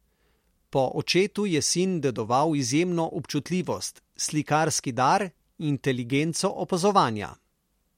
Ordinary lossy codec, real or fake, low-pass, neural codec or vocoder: MP3, 64 kbps; real; 19.8 kHz; none